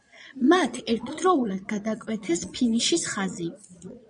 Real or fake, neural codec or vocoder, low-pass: fake; vocoder, 22.05 kHz, 80 mel bands, Vocos; 9.9 kHz